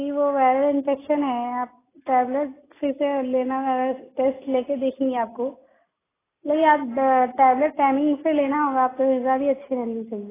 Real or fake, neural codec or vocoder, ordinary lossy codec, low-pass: real; none; AAC, 16 kbps; 3.6 kHz